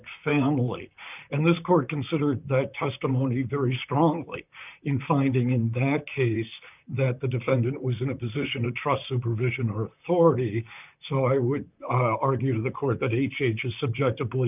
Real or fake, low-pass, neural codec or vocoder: fake; 3.6 kHz; vocoder, 44.1 kHz, 128 mel bands, Pupu-Vocoder